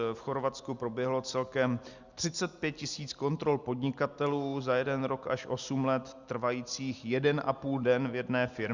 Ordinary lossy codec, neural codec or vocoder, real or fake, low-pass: Opus, 64 kbps; none; real; 7.2 kHz